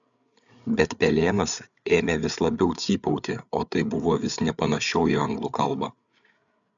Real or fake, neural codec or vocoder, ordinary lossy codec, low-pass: fake; codec, 16 kHz, 8 kbps, FreqCodec, larger model; MP3, 96 kbps; 7.2 kHz